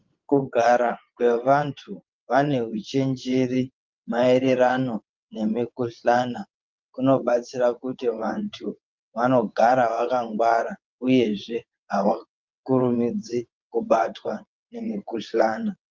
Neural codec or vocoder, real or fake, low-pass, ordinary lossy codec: vocoder, 22.05 kHz, 80 mel bands, WaveNeXt; fake; 7.2 kHz; Opus, 32 kbps